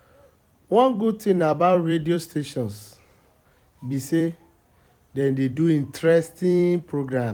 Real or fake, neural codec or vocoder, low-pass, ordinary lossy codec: fake; vocoder, 44.1 kHz, 128 mel bands every 256 samples, BigVGAN v2; 19.8 kHz; none